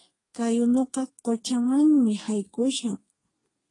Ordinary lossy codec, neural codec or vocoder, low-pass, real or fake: AAC, 32 kbps; codec, 32 kHz, 1.9 kbps, SNAC; 10.8 kHz; fake